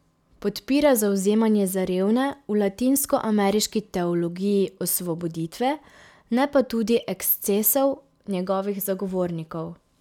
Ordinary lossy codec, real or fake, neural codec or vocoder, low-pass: none; real; none; 19.8 kHz